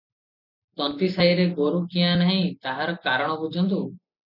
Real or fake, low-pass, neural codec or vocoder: real; 5.4 kHz; none